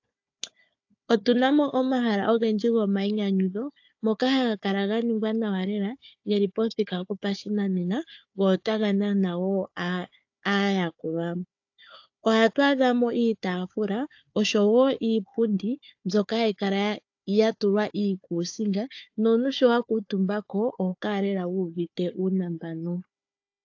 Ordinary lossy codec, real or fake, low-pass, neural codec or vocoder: AAC, 48 kbps; fake; 7.2 kHz; codec, 16 kHz, 4 kbps, FunCodec, trained on Chinese and English, 50 frames a second